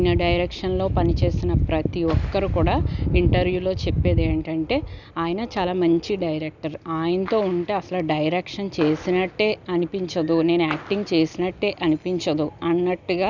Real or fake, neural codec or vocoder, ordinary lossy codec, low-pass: real; none; none; 7.2 kHz